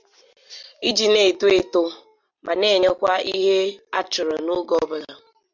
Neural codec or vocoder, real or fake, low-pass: none; real; 7.2 kHz